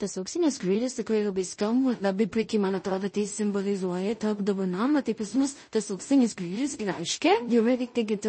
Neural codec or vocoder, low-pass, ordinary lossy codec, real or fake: codec, 16 kHz in and 24 kHz out, 0.4 kbps, LongCat-Audio-Codec, two codebook decoder; 9.9 kHz; MP3, 32 kbps; fake